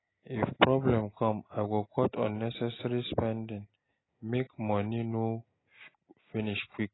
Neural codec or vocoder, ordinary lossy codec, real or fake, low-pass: none; AAC, 16 kbps; real; 7.2 kHz